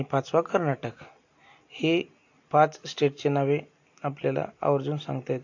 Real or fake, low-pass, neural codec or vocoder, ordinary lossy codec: real; 7.2 kHz; none; AAC, 48 kbps